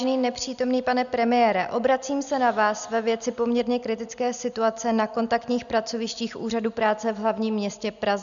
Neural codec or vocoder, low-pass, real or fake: none; 7.2 kHz; real